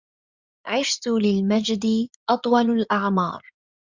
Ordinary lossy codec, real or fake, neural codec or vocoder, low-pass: Opus, 64 kbps; fake; codec, 16 kHz in and 24 kHz out, 2.2 kbps, FireRedTTS-2 codec; 7.2 kHz